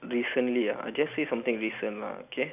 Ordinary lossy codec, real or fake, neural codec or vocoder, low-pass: none; real; none; 3.6 kHz